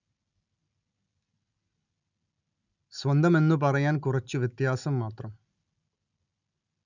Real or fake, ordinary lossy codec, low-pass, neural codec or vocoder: real; none; 7.2 kHz; none